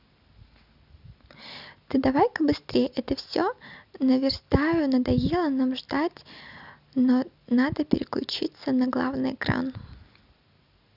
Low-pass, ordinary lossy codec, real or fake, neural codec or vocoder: 5.4 kHz; none; real; none